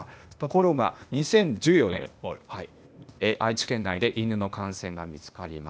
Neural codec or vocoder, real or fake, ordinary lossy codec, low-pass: codec, 16 kHz, 0.8 kbps, ZipCodec; fake; none; none